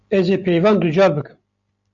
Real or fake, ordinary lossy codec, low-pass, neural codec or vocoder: real; MP3, 64 kbps; 7.2 kHz; none